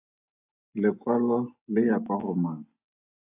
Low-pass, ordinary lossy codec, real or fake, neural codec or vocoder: 3.6 kHz; AAC, 24 kbps; real; none